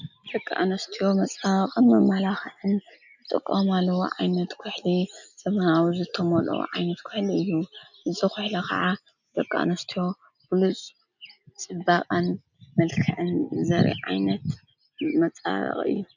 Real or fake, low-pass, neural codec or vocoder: real; 7.2 kHz; none